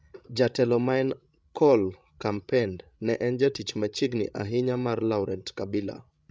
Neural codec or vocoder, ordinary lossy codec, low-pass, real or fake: codec, 16 kHz, 16 kbps, FreqCodec, larger model; none; none; fake